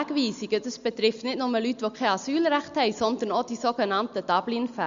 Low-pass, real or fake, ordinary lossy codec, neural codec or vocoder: 7.2 kHz; real; Opus, 64 kbps; none